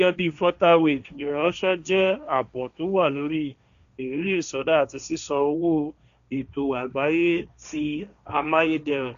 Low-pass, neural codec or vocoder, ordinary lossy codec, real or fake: 7.2 kHz; codec, 16 kHz, 1.1 kbps, Voila-Tokenizer; none; fake